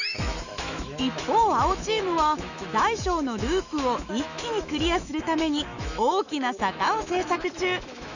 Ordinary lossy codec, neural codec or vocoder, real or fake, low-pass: none; autoencoder, 48 kHz, 128 numbers a frame, DAC-VAE, trained on Japanese speech; fake; 7.2 kHz